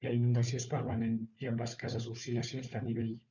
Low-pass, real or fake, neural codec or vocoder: 7.2 kHz; fake; codec, 16 kHz, 4 kbps, FunCodec, trained on Chinese and English, 50 frames a second